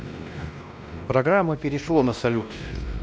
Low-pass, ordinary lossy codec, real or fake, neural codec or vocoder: none; none; fake; codec, 16 kHz, 1 kbps, X-Codec, WavLM features, trained on Multilingual LibriSpeech